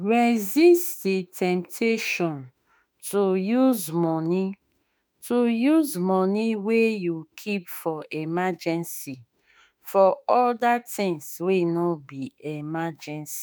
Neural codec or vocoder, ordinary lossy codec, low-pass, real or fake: autoencoder, 48 kHz, 32 numbers a frame, DAC-VAE, trained on Japanese speech; none; none; fake